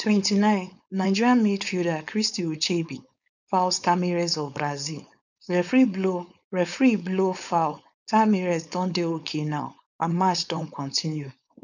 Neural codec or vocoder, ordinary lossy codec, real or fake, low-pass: codec, 16 kHz, 4.8 kbps, FACodec; none; fake; 7.2 kHz